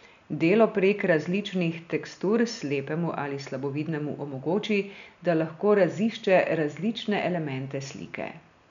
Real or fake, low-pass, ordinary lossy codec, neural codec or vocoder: real; 7.2 kHz; none; none